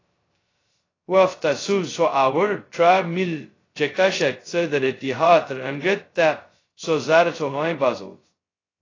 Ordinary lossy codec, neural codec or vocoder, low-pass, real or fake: AAC, 32 kbps; codec, 16 kHz, 0.2 kbps, FocalCodec; 7.2 kHz; fake